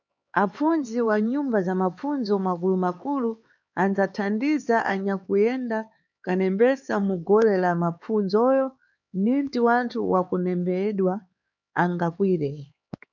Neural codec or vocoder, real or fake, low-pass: codec, 16 kHz, 4 kbps, X-Codec, HuBERT features, trained on LibriSpeech; fake; 7.2 kHz